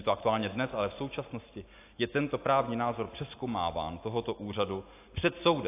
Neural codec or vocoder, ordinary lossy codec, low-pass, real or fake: none; AAC, 24 kbps; 3.6 kHz; real